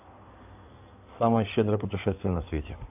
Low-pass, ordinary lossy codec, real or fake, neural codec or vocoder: 3.6 kHz; AAC, 32 kbps; fake; codec, 16 kHz in and 24 kHz out, 2.2 kbps, FireRedTTS-2 codec